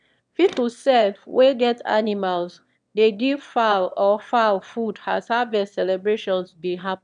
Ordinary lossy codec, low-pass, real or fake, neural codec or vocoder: none; 9.9 kHz; fake; autoencoder, 22.05 kHz, a latent of 192 numbers a frame, VITS, trained on one speaker